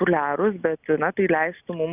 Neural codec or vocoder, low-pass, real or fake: none; 3.6 kHz; real